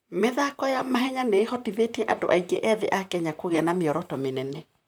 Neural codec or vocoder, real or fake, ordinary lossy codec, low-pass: vocoder, 44.1 kHz, 128 mel bands, Pupu-Vocoder; fake; none; none